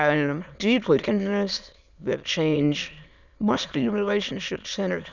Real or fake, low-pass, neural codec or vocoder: fake; 7.2 kHz; autoencoder, 22.05 kHz, a latent of 192 numbers a frame, VITS, trained on many speakers